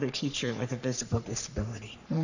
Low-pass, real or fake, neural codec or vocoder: 7.2 kHz; fake; codec, 44.1 kHz, 3.4 kbps, Pupu-Codec